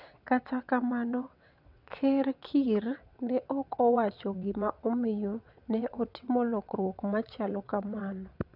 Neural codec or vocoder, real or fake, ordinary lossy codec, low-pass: vocoder, 22.05 kHz, 80 mel bands, WaveNeXt; fake; none; 5.4 kHz